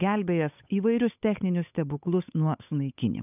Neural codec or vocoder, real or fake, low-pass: codec, 16 kHz, 8 kbps, FunCodec, trained on Chinese and English, 25 frames a second; fake; 3.6 kHz